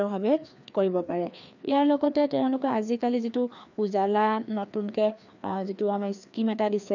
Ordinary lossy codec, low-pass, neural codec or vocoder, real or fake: none; 7.2 kHz; codec, 16 kHz, 2 kbps, FreqCodec, larger model; fake